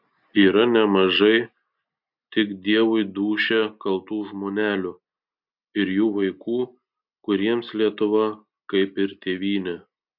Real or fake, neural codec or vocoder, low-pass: real; none; 5.4 kHz